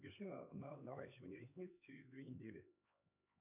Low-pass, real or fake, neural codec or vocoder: 3.6 kHz; fake; codec, 16 kHz, 2 kbps, X-Codec, HuBERT features, trained on LibriSpeech